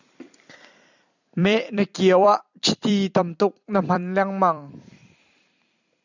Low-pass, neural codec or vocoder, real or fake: 7.2 kHz; vocoder, 44.1 kHz, 128 mel bands every 256 samples, BigVGAN v2; fake